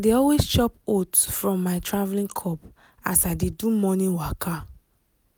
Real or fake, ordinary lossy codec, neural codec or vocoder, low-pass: real; none; none; none